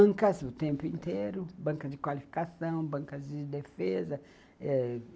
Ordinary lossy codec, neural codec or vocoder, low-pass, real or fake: none; none; none; real